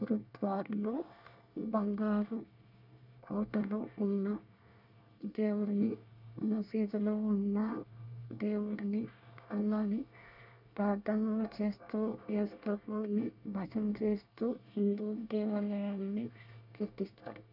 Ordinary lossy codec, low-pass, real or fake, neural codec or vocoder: none; 5.4 kHz; fake; codec, 24 kHz, 1 kbps, SNAC